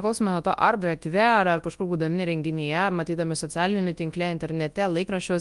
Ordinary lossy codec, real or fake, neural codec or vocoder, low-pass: Opus, 32 kbps; fake; codec, 24 kHz, 0.9 kbps, WavTokenizer, large speech release; 10.8 kHz